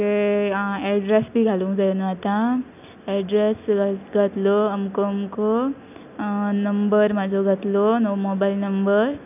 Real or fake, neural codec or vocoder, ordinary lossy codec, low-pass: real; none; none; 3.6 kHz